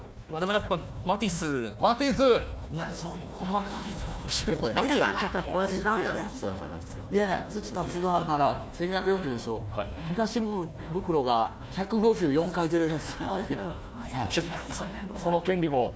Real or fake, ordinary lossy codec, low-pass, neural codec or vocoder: fake; none; none; codec, 16 kHz, 1 kbps, FunCodec, trained on Chinese and English, 50 frames a second